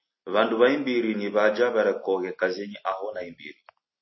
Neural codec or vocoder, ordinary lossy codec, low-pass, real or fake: none; MP3, 24 kbps; 7.2 kHz; real